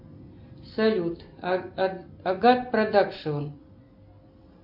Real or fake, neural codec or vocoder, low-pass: real; none; 5.4 kHz